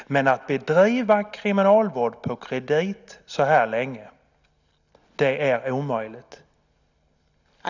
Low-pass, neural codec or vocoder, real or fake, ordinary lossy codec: 7.2 kHz; none; real; none